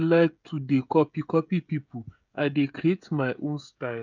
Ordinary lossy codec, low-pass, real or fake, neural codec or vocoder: none; 7.2 kHz; fake; codec, 16 kHz, 16 kbps, FreqCodec, smaller model